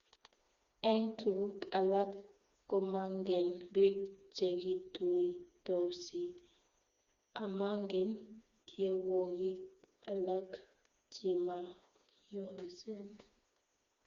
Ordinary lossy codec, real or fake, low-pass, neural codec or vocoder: Opus, 64 kbps; fake; 7.2 kHz; codec, 16 kHz, 2 kbps, FreqCodec, smaller model